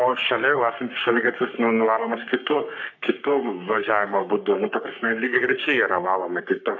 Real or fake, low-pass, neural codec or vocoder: fake; 7.2 kHz; codec, 44.1 kHz, 3.4 kbps, Pupu-Codec